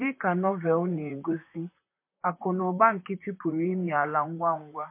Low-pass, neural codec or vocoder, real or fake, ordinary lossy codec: 3.6 kHz; vocoder, 44.1 kHz, 128 mel bands, Pupu-Vocoder; fake; MP3, 24 kbps